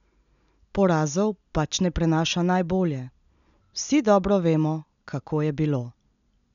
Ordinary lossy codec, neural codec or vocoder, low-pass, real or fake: none; none; 7.2 kHz; real